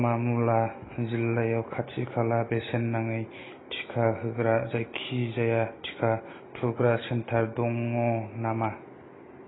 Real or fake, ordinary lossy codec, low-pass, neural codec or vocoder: real; AAC, 16 kbps; 7.2 kHz; none